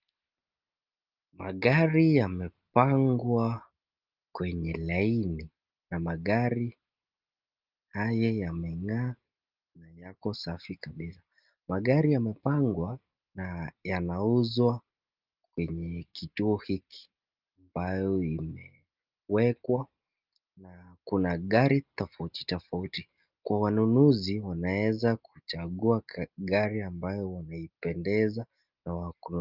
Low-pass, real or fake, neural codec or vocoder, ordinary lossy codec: 5.4 kHz; real; none; Opus, 32 kbps